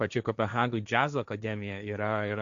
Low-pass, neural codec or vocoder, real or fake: 7.2 kHz; codec, 16 kHz, 1.1 kbps, Voila-Tokenizer; fake